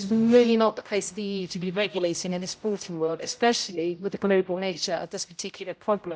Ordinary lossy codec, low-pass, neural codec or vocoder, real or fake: none; none; codec, 16 kHz, 0.5 kbps, X-Codec, HuBERT features, trained on general audio; fake